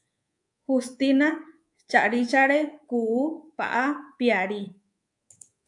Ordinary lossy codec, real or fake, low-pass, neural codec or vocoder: AAC, 64 kbps; fake; 10.8 kHz; codec, 24 kHz, 3.1 kbps, DualCodec